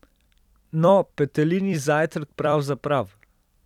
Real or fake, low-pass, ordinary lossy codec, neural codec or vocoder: fake; 19.8 kHz; none; vocoder, 44.1 kHz, 128 mel bands every 256 samples, BigVGAN v2